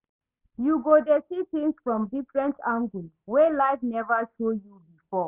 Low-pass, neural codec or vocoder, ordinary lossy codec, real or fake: 3.6 kHz; none; none; real